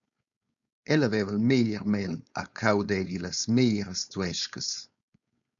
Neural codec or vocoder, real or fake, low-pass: codec, 16 kHz, 4.8 kbps, FACodec; fake; 7.2 kHz